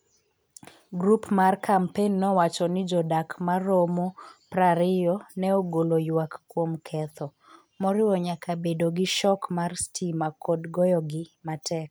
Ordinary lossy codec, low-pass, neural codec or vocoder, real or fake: none; none; none; real